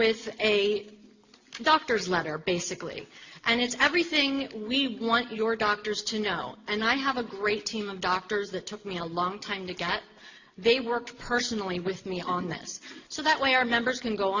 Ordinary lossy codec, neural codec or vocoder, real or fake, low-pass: Opus, 64 kbps; none; real; 7.2 kHz